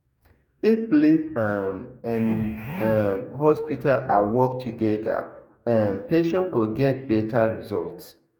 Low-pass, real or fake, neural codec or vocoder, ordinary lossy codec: 19.8 kHz; fake; codec, 44.1 kHz, 2.6 kbps, DAC; none